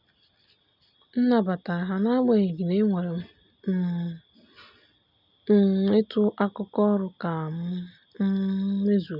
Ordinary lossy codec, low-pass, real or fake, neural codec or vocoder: none; 5.4 kHz; real; none